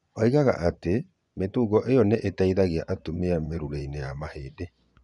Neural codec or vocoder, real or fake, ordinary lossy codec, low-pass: vocoder, 24 kHz, 100 mel bands, Vocos; fake; none; 10.8 kHz